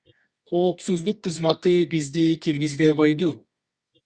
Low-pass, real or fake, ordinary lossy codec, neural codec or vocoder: 9.9 kHz; fake; Opus, 64 kbps; codec, 24 kHz, 0.9 kbps, WavTokenizer, medium music audio release